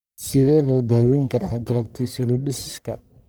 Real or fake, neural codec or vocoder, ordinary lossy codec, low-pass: fake; codec, 44.1 kHz, 1.7 kbps, Pupu-Codec; none; none